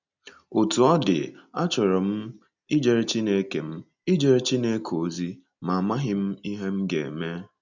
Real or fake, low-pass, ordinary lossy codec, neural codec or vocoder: real; 7.2 kHz; none; none